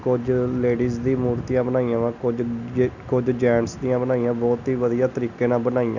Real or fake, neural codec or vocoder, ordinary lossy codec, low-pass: real; none; none; 7.2 kHz